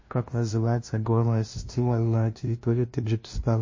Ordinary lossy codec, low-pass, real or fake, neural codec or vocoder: MP3, 32 kbps; 7.2 kHz; fake; codec, 16 kHz, 0.5 kbps, FunCodec, trained on LibriTTS, 25 frames a second